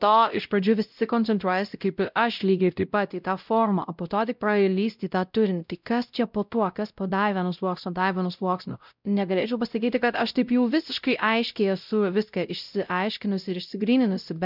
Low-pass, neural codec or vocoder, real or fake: 5.4 kHz; codec, 16 kHz, 0.5 kbps, X-Codec, WavLM features, trained on Multilingual LibriSpeech; fake